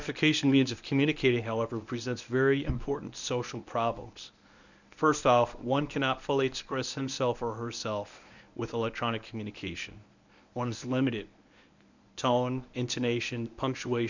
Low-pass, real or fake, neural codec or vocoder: 7.2 kHz; fake; codec, 24 kHz, 0.9 kbps, WavTokenizer, medium speech release version 1